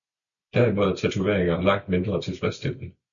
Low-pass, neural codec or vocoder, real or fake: 7.2 kHz; none; real